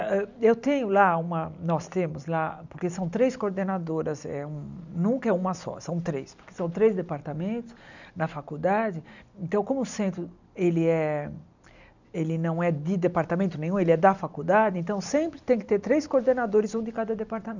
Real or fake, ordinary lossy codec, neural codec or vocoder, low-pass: real; none; none; 7.2 kHz